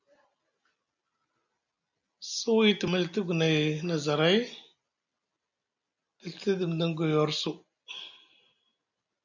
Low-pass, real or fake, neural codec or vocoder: 7.2 kHz; real; none